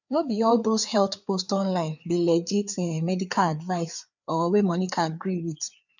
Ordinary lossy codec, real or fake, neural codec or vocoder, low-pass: none; fake; codec, 16 kHz, 4 kbps, FreqCodec, larger model; 7.2 kHz